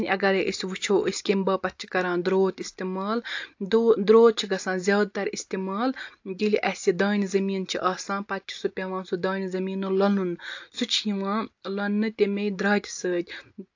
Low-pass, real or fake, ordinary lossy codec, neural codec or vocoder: 7.2 kHz; real; AAC, 48 kbps; none